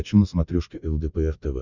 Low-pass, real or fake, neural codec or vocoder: 7.2 kHz; real; none